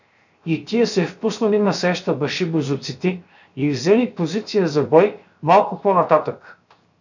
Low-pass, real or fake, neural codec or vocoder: 7.2 kHz; fake; codec, 16 kHz, 0.7 kbps, FocalCodec